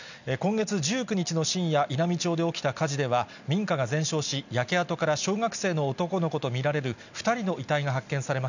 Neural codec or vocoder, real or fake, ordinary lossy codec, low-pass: none; real; none; 7.2 kHz